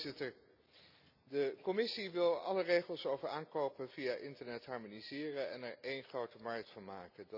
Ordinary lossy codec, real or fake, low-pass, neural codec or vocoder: none; real; 5.4 kHz; none